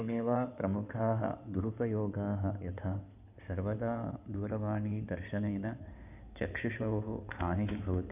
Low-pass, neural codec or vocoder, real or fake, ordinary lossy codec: 3.6 kHz; codec, 16 kHz in and 24 kHz out, 2.2 kbps, FireRedTTS-2 codec; fake; none